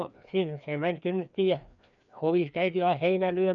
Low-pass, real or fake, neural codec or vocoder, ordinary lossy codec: 7.2 kHz; fake; codec, 16 kHz, 2 kbps, FreqCodec, larger model; none